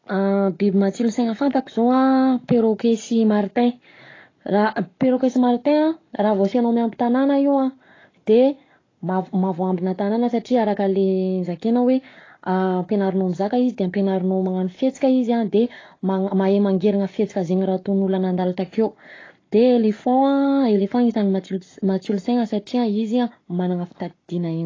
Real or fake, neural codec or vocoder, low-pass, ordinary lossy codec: fake; codec, 44.1 kHz, 7.8 kbps, Pupu-Codec; 7.2 kHz; AAC, 32 kbps